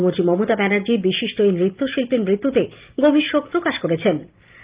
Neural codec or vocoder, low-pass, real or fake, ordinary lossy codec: none; 3.6 kHz; real; Opus, 32 kbps